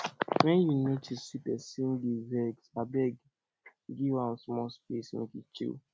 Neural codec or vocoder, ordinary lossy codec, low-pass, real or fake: none; none; none; real